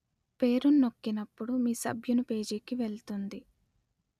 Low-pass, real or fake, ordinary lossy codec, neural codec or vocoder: 14.4 kHz; real; none; none